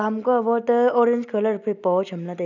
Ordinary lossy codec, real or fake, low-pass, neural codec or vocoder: none; real; 7.2 kHz; none